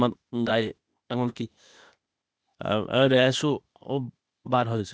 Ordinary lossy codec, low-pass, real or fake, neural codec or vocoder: none; none; fake; codec, 16 kHz, 0.8 kbps, ZipCodec